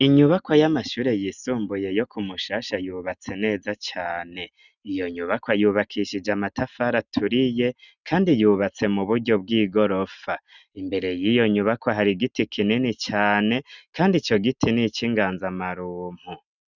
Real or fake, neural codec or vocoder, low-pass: real; none; 7.2 kHz